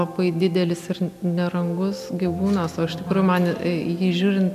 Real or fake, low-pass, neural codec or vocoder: real; 14.4 kHz; none